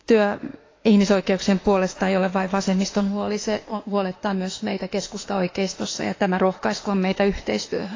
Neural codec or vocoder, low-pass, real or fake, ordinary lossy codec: autoencoder, 48 kHz, 32 numbers a frame, DAC-VAE, trained on Japanese speech; 7.2 kHz; fake; AAC, 32 kbps